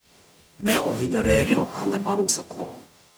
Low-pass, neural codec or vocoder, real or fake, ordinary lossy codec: none; codec, 44.1 kHz, 0.9 kbps, DAC; fake; none